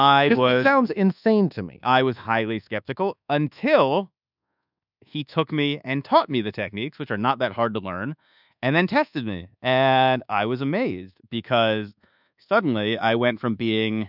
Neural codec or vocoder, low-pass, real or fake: codec, 24 kHz, 1.2 kbps, DualCodec; 5.4 kHz; fake